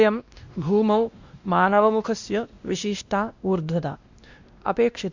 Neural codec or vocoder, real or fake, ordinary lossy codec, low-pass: codec, 16 kHz, 1 kbps, X-Codec, WavLM features, trained on Multilingual LibriSpeech; fake; Opus, 64 kbps; 7.2 kHz